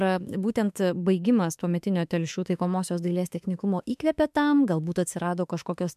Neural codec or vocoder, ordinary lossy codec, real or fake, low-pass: autoencoder, 48 kHz, 32 numbers a frame, DAC-VAE, trained on Japanese speech; MP3, 96 kbps; fake; 14.4 kHz